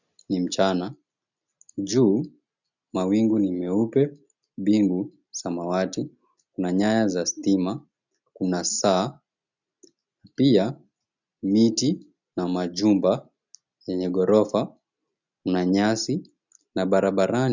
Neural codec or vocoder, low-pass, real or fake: none; 7.2 kHz; real